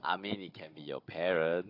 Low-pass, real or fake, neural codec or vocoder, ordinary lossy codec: 5.4 kHz; fake; codec, 16 kHz, 16 kbps, FunCodec, trained on Chinese and English, 50 frames a second; AAC, 32 kbps